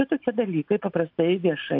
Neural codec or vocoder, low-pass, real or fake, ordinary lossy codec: none; 5.4 kHz; real; AAC, 48 kbps